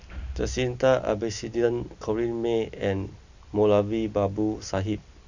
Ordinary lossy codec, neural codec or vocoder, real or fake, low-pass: Opus, 64 kbps; none; real; 7.2 kHz